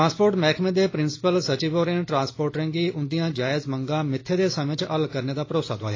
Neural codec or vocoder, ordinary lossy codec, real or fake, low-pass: none; AAC, 32 kbps; real; 7.2 kHz